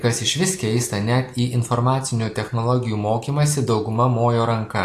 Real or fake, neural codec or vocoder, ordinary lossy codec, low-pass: real; none; AAC, 64 kbps; 14.4 kHz